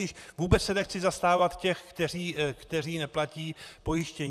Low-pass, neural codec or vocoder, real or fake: 14.4 kHz; vocoder, 44.1 kHz, 128 mel bands, Pupu-Vocoder; fake